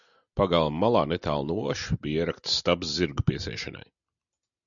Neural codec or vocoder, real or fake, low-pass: none; real; 7.2 kHz